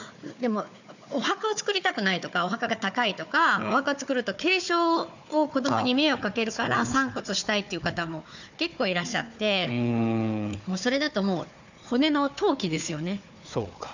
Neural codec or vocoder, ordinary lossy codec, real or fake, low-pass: codec, 16 kHz, 4 kbps, FunCodec, trained on Chinese and English, 50 frames a second; none; fake; 7.2 kHz